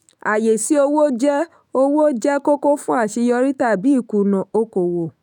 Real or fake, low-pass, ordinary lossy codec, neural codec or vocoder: fake; 19.8 kHz; none; autoencoder, 48 kHz, 128 numbers a frame, DAC-VAE, trained on Japanese speech